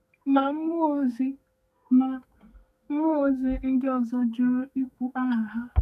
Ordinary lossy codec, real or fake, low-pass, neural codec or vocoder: none; fake; 14.4 kHz; codec, 32 kHz, 1.9 kbps, SNAC